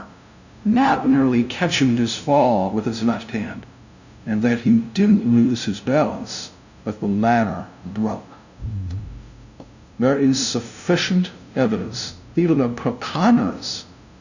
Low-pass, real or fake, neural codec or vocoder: 7.2 kHz; fake; codec, 16 kHz, 0.5 kbps, FunCodec, trained on LibriTTS, 25 frames a second